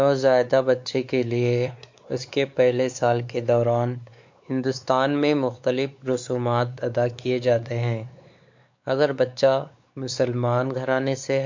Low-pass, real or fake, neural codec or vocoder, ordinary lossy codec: 7.2 kHz; fake; codec, 16 kHz, 4 kbps, X-Codec, WavLM features, trained on Multilingual LibriSpeech; MP3, 64 kbps